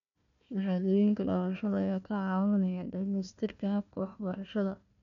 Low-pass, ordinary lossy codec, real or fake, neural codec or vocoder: 7.2 kHz; none; fake; codec, 16 kHz, 1 kbps, FunCodec, trained on Chinese and English, 50 frames a second